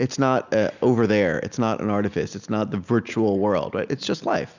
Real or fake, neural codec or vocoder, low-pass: real; none; 7.2 kHz